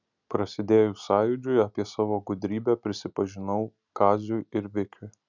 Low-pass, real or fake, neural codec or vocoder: 7.2 kHz; real; none